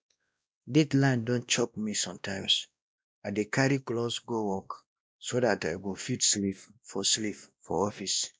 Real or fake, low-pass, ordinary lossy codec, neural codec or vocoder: fake; none; none; codec, 16 kHz, 1 kbps, X-Codec, WavLM features, trained on Multilingual LibriSpeech